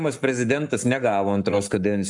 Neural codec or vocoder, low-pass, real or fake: codec, 44.1 kHz, 7.8 kbps, Pupu-Codec; 10.8 kHz; fake